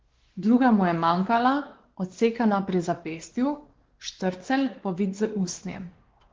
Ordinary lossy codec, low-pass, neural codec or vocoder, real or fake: Opus, 16 kbps; 7.2 kHz; codec, 16 kHz, 2 kbps, X-Codec, WavLM features, trained on Multilingual LibriSpeech; fake